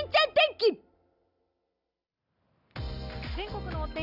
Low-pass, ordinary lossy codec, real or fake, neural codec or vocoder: 5.4 kHz; none; real; none